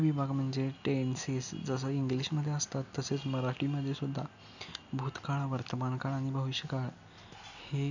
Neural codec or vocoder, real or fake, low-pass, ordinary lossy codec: none; real; 7.2 kHz; none